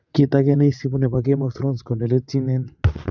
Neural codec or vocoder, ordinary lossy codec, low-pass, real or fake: vocoder, 22.05 kHz, 80 mel bands, WaveNeXt; none; 7.2 kHz; fake